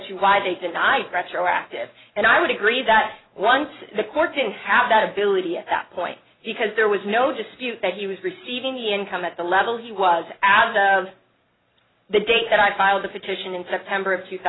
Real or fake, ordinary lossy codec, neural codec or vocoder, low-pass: real; AAC, 16 kbps; none; 7.2 kHz